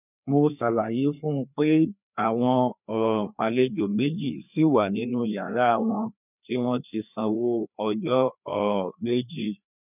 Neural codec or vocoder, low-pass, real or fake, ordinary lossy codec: codec, 16 kHz, 2 kbps, FreqCodec, larger model; 3.6 kHz; fake; none